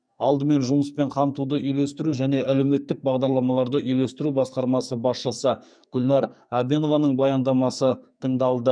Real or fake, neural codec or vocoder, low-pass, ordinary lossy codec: fake; codec, 44.1 kHz, 2.6 kbps, SNAC; 9.9 kHz; none